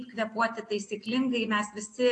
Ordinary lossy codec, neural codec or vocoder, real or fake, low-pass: AAC, 48 kbps; none; real; 10.8 kHz